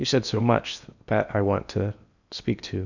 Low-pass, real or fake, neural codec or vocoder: 7.2 kHz; fake; codec, 16 kHz in and 24 kHz out, 0.8 kbps, FocalCodec, streaming, 65536 codes